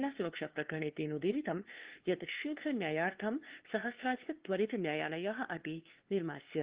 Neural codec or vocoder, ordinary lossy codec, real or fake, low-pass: codec, 16 kHz, 2 kbps, FunCodec, trained on LibriTTS, 25 frames a second; Opus, 16 kbps; fake; 3.6 kHz